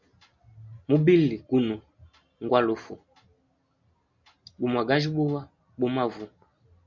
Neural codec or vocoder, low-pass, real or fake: none; 7.2 kHz; real